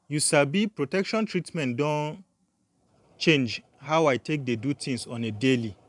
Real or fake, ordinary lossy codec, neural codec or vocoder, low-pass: fake; none; vocoder, 44.1 kHz, 128 mel bands every 512 samples, BigVGAN v2; 10.8 kHz